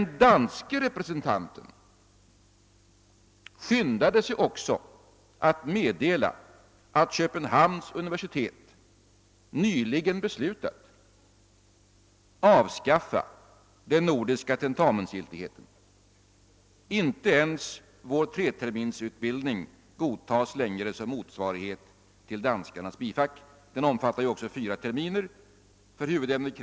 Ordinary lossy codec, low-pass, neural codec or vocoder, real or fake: none; none; none; real